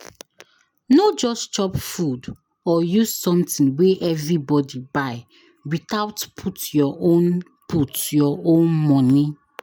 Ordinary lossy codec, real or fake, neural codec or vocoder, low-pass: none; real; none; none